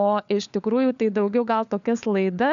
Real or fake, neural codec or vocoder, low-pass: fake; codec, 16 kHz, 4 kbps, FunCodec, trained on LibriTTS, 50 frames a second; 7.2 kHz